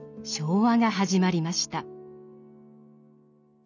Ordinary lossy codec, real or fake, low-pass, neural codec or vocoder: none; real; 7.2 kHz; none